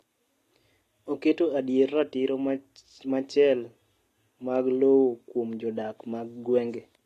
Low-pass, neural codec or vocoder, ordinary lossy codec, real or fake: 14.4 kHz; none; AAC, 48 kbps; real